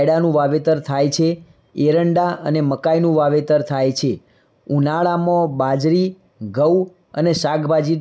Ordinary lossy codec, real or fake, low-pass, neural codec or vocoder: none; real; none; none